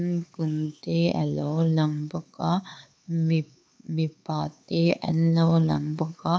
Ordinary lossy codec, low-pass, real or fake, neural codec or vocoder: none; none; fake; codec, 16 kHz, 4 kbps, X-Codec, HuBERT features, trained on LibriSpeech